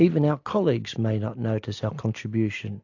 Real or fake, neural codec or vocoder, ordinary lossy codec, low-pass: real; none; AAC, 48 kbps; 7.2 kHz